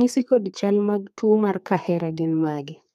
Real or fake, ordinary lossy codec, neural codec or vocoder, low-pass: fake; none; codec, 32 kHz, 1.9 kbps, SNAC; 14.4 kHz